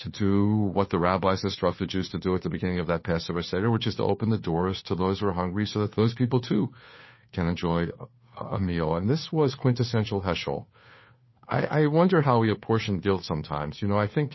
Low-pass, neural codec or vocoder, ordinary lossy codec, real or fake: 7.2 kHz; codec, 16 kHz, 2 kbps, FunCodec, trained on Chinese and English, 25 frames a second; MP3, 24 kbps; fake